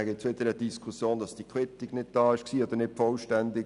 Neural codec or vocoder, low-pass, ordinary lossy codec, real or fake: none; 10.8 kHz; none; real